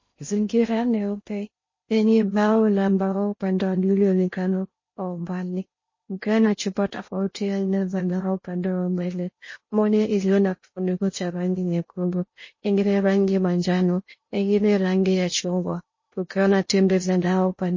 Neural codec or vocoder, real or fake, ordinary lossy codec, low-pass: codec, 16 kHz in and 24 kHz out, 0.6 kbps, FocalCodec, streaming, 2048 codes; fake; MP3, 32 kbps; 7.2 kHz